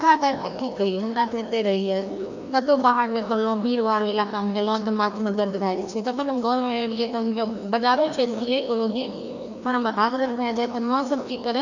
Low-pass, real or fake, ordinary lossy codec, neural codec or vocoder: 7.2 kHz; fake; none; codec, 16 kHz, 1 kbps, FreqCodec, larger model